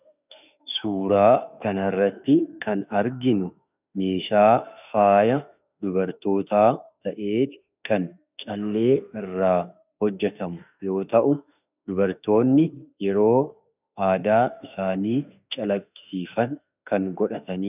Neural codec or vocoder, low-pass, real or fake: autoencoder, 48 kHz, 32 numbers a frame, DAC-VAE, trained on Japanese speech; 3.6 kHz; fake